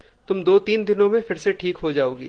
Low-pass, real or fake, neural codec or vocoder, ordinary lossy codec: 9.9 kHz; real; none; Opus, 16 kbps